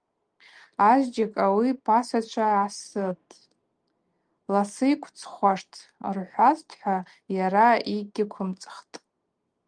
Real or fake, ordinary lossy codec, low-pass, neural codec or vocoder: real; Opus, 32 kbps; 9.9 kHz; none